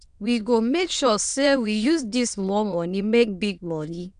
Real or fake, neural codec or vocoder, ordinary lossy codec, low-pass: fake; autoencoder, 22.05 kHz, a latent of 192 numbers a frame, VITS, trained on many speakers; none; 9.9 kHz